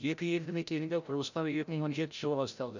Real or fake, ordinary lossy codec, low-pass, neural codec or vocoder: fake; none; 7.2 kHz; codec, 16 kHz, 0.5 kbps, FreqCodec, larger model